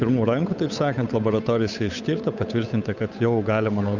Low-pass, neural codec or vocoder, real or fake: 7.2 kHz; codec, 16 kHz, 8 kbps, FunCodec, trained on Chinese and English, 25 frames a second; fake